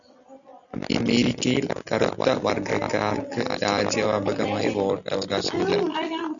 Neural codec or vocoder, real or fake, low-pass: none; real; 7.2 kHz